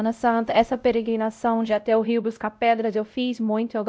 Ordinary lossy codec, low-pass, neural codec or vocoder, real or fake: none; none; codec, 16 kHz, 0.5 kbps, X-Codec, WavLM features, trained on Multilingual LibriSpeech; fake